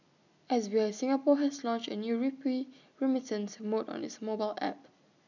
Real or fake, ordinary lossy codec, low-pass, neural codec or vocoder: real; none; 7.2 kHz; none